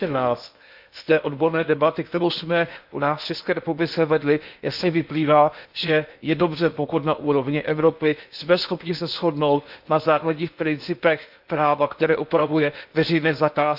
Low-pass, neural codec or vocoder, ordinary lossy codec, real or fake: 5.4 kHz; codec, 16 kHz in and 24 kHz out, 0.8 kbps, FocalCodec, streaming, 65536 codes; Opus, 64 kbps; fake